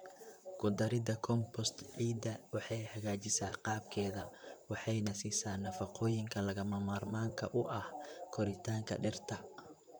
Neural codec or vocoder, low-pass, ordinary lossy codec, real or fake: vocoder, 44.1 kHz, 128 mel bands, Pupu-Vocoder; none; none; fake